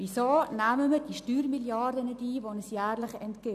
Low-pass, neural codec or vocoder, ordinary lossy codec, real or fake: 14.4 kHz; none; none; real